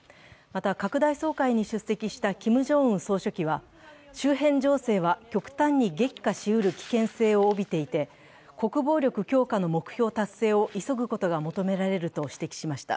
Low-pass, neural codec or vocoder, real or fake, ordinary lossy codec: none; none; real; none